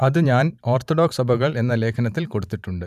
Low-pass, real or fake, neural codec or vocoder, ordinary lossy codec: 14.4 kHz; fake; vocoder, 44.1 kHz, 128 mel bands every 512 samples, BigVGAN v2; none